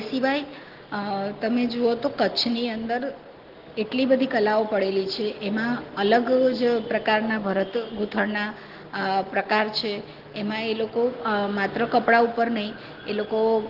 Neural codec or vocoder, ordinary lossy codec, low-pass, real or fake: none; Opus, 16 kbps; 5.4 kHz; real